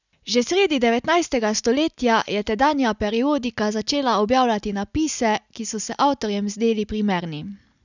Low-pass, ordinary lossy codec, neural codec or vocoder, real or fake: 7.2 kHz; none; none; real